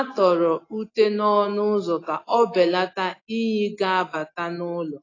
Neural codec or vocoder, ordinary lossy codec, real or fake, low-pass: none; AAC, 32 kbps; real; 7.2 kHz